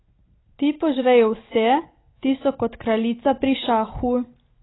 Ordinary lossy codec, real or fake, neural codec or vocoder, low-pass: AAC, 16 kbps; real; none; 7.2 kHz